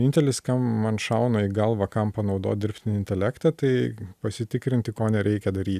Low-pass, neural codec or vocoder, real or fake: 14.4 kHz; none; real